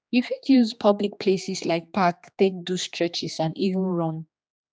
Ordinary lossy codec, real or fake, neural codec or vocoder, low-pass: none; fake; codec, 16 kHz, 2 kbps, X-Codec, HuBERT features, trained on general audio; none